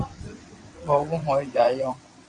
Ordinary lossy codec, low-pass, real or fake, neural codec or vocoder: AAC, 48 kbps; 9.9 kHz; fake; vocoder, 22.05 kHz, 80 mel bands, WaveNeXt